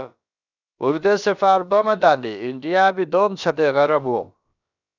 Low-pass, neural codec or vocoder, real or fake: 7.2 kHz; codec, 16 kHz, about 1 kbps, DyCAST, with the encoder's durations; fake